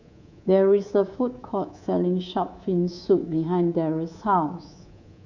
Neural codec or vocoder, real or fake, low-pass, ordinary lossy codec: codec, 24 kHz, 3.1 kbps, DualCodec; fake; 7.2 kHz; none